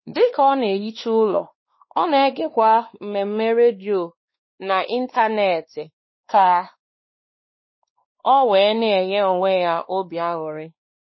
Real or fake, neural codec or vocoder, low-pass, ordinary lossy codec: fake; codec, 16 kHz, 2 kbps, X-Codec, WavLM features, trained on Multilingual LibriSpeech; 7.2 kHz; MP3, 24 kbps